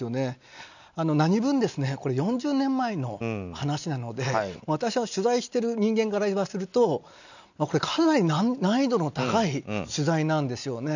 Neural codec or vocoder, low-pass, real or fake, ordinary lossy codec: none; 7.2 kHz; real; none